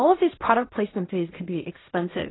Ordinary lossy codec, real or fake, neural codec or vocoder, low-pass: AAC, 16 kbps; fake; codec, 16 kHz in and 24 kHz out, 0.4 kbps, LongCat-Audio-Codec, fine tuned four codebook decoder; 7.2 kHz